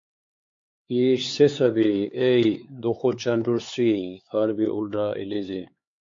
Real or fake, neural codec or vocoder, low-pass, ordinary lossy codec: fake; codec, 16 kHz, 4 kbps, X-Codec, HuBERT features, trained on LibriSpeech; 7.2 kHz; MP3, 48 kbps